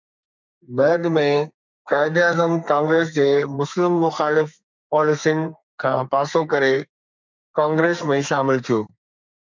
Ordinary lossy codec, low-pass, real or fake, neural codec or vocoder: MP3, 64 kbps; 7.2 kHz; fake; codec, 32 kHz, 1.9 kbps, SNAC